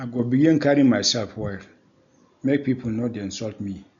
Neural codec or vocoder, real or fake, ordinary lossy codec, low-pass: none; real; none; 7.2 kHz